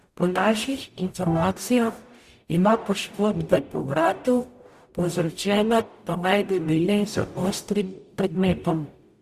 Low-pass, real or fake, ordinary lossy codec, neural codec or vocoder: 14.4 kHz; fake; Opus, 64 kbps; codec, 44.1 kHz, 0.9 kbps, DAC